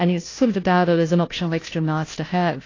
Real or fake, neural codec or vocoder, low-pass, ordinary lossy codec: fake; codec, 16 kHz, 0.5 kbps, FunCodec, trained on Chinese and English, 25 frames a second; 7.2 kHz; AAC, 32 kbps